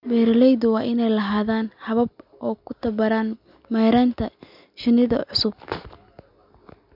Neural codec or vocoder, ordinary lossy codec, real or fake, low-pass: none; none; real; 5.4 kHz